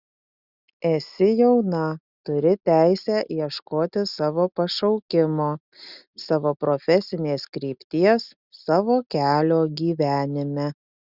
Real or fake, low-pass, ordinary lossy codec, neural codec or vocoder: real; 5.4 kHz; Opus, 64 kbps; none